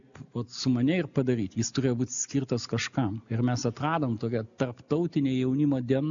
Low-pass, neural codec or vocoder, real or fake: 7.2 kHz; none; real